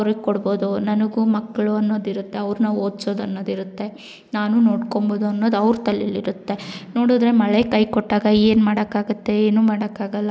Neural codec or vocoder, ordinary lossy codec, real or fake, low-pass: none; none; real; none